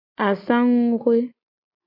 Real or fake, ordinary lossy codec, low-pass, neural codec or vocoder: real; MP3, 32 kbps; 5.4 kHz; none